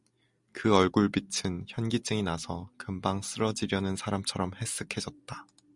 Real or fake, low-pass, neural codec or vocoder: real; 10.8 kHz; none